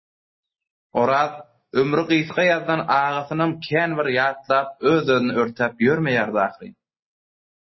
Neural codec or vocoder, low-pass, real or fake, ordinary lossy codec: none; 7.2 kHz; real; MP3, 24 kbps